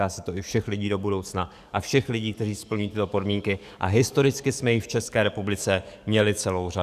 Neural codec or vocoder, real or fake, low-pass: codec, 44.1 kHz, 7.8 kbps, DAC; fake; 14.4 kHz